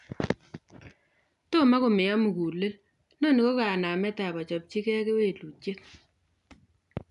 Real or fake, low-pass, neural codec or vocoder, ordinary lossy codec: real; 10.8 kHz; none; none